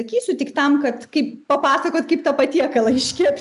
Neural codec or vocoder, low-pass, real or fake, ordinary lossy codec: none; 10.8 kHz; real; AAC, 96 kbps